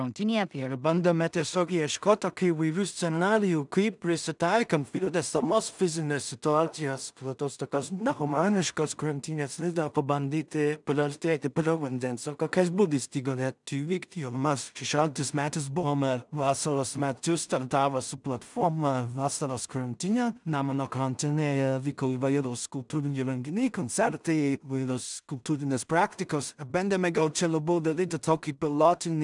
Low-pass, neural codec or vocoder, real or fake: 10.8 kHz; codec, 16 kHz in and 24 kHz out, 0.4 kbps, LongCat-Audio-Codec, two codebook decoder; fake